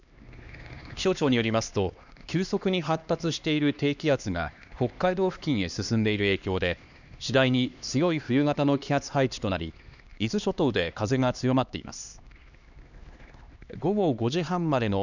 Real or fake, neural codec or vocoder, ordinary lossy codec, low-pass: fake; codec, 16 kHz, 2 kbps, X-Codec, HuBERT features, trained on LibriSpeech; none; 7.2 kHz